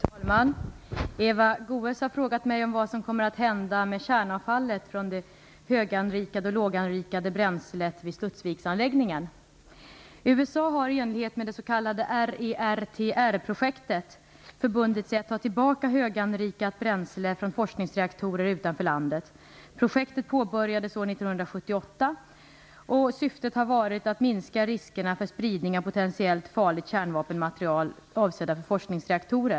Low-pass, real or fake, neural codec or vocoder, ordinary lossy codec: none; real; none; none